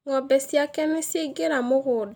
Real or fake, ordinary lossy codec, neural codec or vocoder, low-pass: real; none; none; none